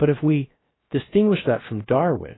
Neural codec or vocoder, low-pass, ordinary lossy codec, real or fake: codec, 16 kHz, 0.7 kbps, FocalCodec; 7.2 kHz; AAC, 16 kbps; fake